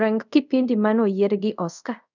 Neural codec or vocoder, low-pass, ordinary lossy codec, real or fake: codec, 24 kHz, 0.5 kbps, DualCodec; 7.2 kHz; none; fake